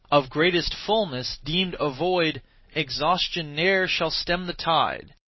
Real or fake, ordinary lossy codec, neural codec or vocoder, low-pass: real; MP3, 24 kbps; none; 7.2 kHz